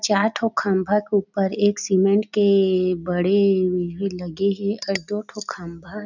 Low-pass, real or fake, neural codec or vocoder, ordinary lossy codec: 7.2 kHz; real; none; none